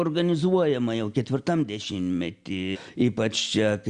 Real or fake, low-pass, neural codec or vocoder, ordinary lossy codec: real; 9.9 kHz; none; Opus, 64 kbps